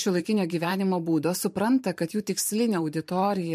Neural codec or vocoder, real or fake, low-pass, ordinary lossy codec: vocoder, 44.1 kHz, 128 mel bands every 512 samples, BigVGAN v2; fake; 14.4 kHz; MP3, 64 kbps